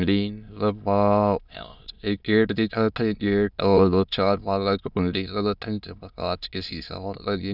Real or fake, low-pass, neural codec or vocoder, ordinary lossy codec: fake; 5.4 kHz; autoencoder, 22.05 kHz, a latent of 192 numbers a frame, VITS, trained on many speakers; none